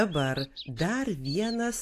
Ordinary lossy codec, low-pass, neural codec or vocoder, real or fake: AAC, 64 kbps; 14.4 kHz; none; real